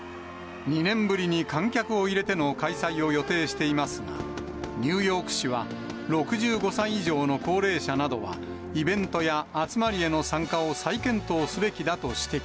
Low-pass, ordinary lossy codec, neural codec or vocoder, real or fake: none; none; none; real